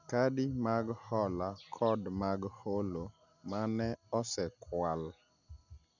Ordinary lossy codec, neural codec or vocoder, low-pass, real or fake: none; none; 7.2 kHz; real